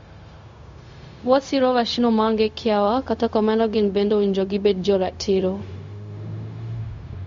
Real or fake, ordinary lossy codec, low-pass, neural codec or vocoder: fake; MP3, 48 kbps; 7.2 kHz; codec, 16 kHz, 0.4 kbps, LongCat-Audio-Codec